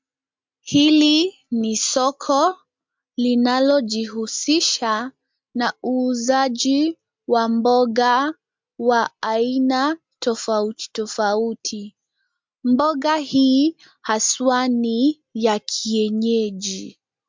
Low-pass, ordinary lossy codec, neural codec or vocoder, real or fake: 7.2 kHz; MP3, 64 kbps; none; real